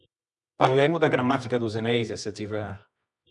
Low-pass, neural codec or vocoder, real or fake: 10.8 kHz; codec, 24 kHz, 0.9 kbps, WavTokenizer, medium music audio release; fake